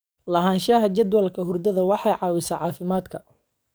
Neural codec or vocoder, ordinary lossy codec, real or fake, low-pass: codec, 44.1 kHz, 7.8 kbps, DAC; none; fake; none